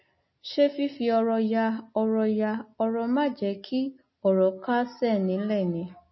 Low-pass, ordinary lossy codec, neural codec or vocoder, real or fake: 7.2 kHz; MP3, 24 kbps; none; real